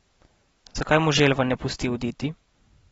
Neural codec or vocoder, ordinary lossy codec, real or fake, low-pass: none; AAC, 24 kbps; real; 19.8 kHz